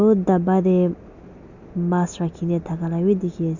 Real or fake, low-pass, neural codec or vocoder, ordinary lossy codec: real; 7.2 kHz; none; none